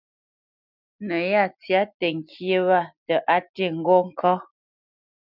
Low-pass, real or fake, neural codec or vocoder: 5.4 kHz; real; none